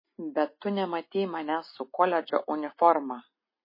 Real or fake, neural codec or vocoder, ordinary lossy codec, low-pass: real; none; MP3, 24 kbps; 5.4 kHz